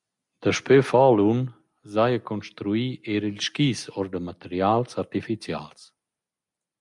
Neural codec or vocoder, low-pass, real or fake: none; 10.8 kHz; real